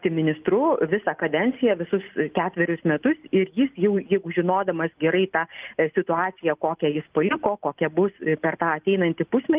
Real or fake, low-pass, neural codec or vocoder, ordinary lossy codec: real; 3.6 kHz; none; Opus, 24 kbps